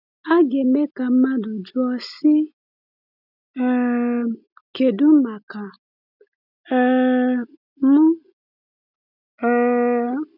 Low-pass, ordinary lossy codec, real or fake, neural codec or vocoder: 5.4 kHz; none; real; none